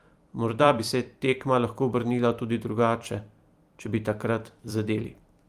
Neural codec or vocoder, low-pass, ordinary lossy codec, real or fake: vocoder, 44.1 kHz, 128 mel bands every 256 samples, BigVGAN v2; 14.4 kHz; Opus, 32 kbps; fake